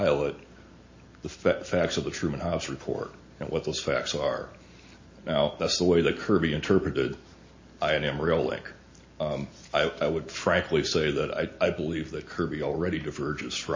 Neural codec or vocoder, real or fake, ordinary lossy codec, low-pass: none; real; MP3, 32 kbps; 7.2 kHz